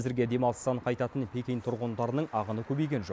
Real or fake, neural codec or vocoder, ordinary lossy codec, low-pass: real; none; none; none